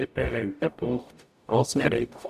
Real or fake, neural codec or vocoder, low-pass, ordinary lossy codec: fake; codec, 44.1 kHz, 0.9 kbps, DAC; 14.4 kHz; none